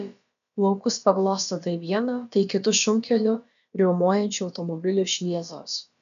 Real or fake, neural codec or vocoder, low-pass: fake; codec, 16 kHz, about 1 kbps, DyCAST, with the encoder's durations; 7.2 kHz